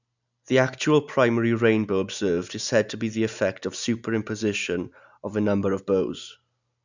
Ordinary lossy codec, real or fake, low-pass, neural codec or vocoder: none; real; 7.2 kHz; none